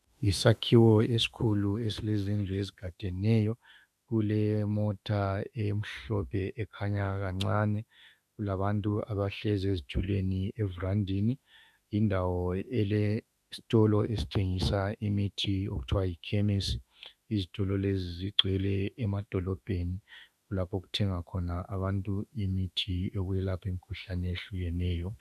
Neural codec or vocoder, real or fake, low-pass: autoencoder, 48 kHz, 32 numbers a frame, DAC-VAE, trained on Japanese speech; fake; 14.4 kHz